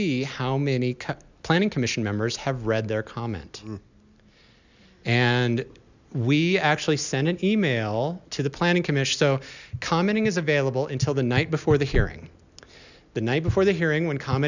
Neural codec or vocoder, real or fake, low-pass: none; real; 7.2 kHz